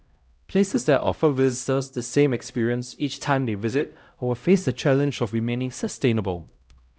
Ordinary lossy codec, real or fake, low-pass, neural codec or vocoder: none; fake; none; codec, 16 kHz, 0.5 kbps, X-Codec, HuBERT features, trained on LibriSpeech